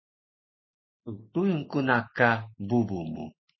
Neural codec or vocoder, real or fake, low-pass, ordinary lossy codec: none; real; 7.2 kHz; MP3, 24 kbps